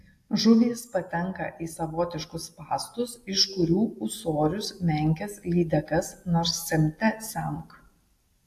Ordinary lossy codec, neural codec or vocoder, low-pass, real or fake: AAC, 64 kbps; none; 14.4 kHz; real